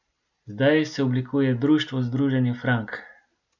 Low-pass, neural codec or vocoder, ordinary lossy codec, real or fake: 7.2 kHz; none; none; real